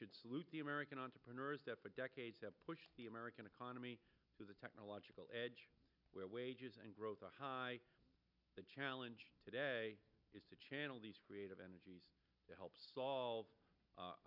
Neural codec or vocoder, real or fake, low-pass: none; real; 5.4 kHz